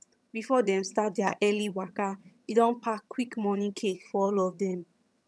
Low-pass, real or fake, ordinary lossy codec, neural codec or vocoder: none; fake; none; vocoder, 22.05 kHz, 80 mel bands, HiFi-GAN